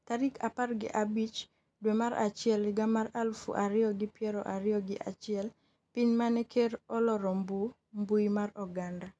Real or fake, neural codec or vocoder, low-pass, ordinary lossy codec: real; none; none; none